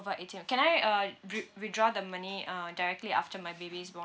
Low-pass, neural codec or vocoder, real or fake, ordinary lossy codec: none; none; real; none